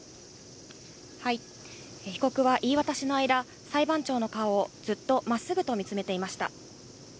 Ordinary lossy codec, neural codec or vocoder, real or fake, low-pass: none; none; real; none